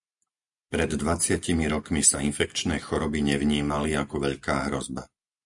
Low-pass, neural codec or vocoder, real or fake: 10.8 kHz; none; real